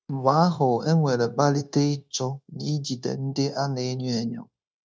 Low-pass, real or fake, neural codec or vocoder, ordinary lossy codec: none; fake; codec, 16 kHz, 0.9 kbps, LongCat-Audio-Codec; none